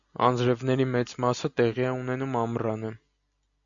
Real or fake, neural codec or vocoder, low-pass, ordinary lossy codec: real; none; 7.2 kHz; MP3, 96 kbps